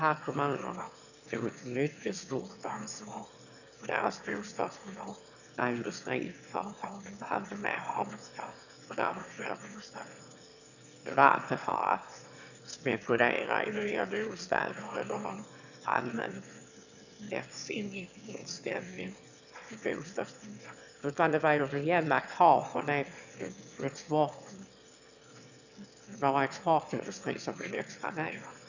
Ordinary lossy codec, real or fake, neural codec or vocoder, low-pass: none; fake; autoencoder, 22.05 kHz, a latent of 192 numbers a frame, VITS, trained on one speaker; 7.2 kHz